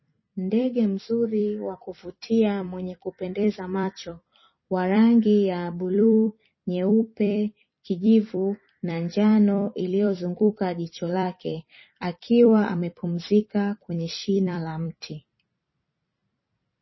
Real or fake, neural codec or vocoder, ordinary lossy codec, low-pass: fake; vocoder, 44.1 kHz, 128 mel bands every 256 samples, BigVGAN v2; MP3, 24 kbps; 7.2 kHz